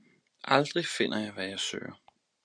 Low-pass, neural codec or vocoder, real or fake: 9.9 kHz; none; real